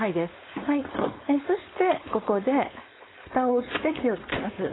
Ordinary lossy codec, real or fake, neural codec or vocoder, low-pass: AAC, 16 kbps; fake; codec, 16 kHz, 4.8 kbps, FACodec; 7.2 kHz